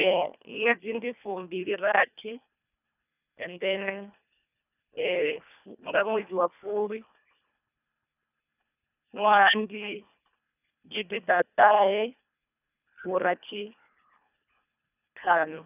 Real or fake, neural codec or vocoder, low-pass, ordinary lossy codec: fake; codec, 24 kHz, 1.5 kbps, HILCodec; 3.6 kHz; none